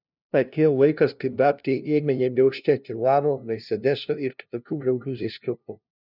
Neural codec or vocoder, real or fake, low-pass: codec, 16 kHz, 0.5 kbps, FunCodec, trained on LibriTTS, 25 frames a second; fake; 5.4 kHz